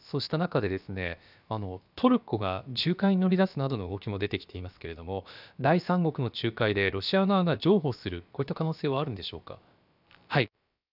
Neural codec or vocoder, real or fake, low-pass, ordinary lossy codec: codec, 16 kHz, about 1 kbps, DyCAST, with the encoder's durations; fake; 5.4 kHz; none